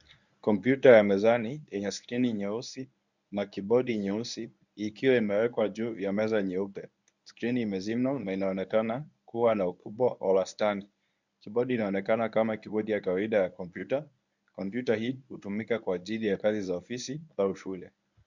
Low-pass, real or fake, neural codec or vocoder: 7.2 kHz; fake; codec, 24 kHz, 0.9 kbps, WavTokenizer, medium speech release version 1